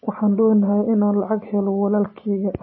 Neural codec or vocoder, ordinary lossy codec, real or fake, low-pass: none; MP3, 24 kbps; real; 7.2 kHz